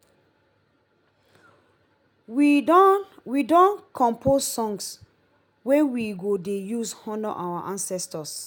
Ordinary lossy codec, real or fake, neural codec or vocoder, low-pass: none; real; none; 19.8 kHz